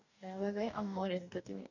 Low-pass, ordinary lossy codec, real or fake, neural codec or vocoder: 7.2 kHz; none; fake; codec, 44.1 kHz, 2.6 kbps, DAC